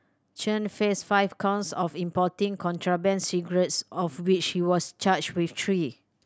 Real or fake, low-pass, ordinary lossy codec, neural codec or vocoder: real; none; none; none